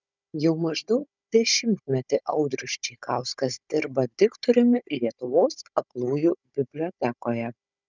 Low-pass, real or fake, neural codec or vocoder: 7.2 kHz; fake; codec, 16 kHz, 16 kbps, FunCodec, trained on Chinese and English, 50 frames a second